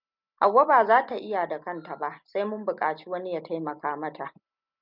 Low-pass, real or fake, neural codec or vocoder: 5.4 kHz; real; none